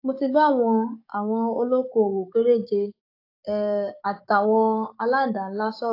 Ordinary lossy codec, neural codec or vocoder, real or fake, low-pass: AAC, 32 kbps; codec, 44.1 kHz, 7.8 kbps, DAC; fake; 5.4 kHz